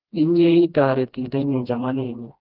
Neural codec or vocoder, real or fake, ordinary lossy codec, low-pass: codec, 16 kHz, 1 kbps, FreqCodec, smaller model; fake; Opus, 24 kbps; 5.4 kHz